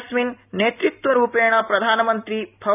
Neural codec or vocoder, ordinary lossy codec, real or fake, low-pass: none; none; real; 3.6 kHz